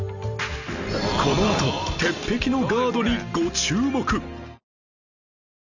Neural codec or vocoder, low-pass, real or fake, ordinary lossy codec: none; 7.2 kHz; real; none